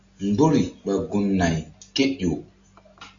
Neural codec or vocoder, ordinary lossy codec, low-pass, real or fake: none; AAC, 64 kbps; 7.2 kHz; real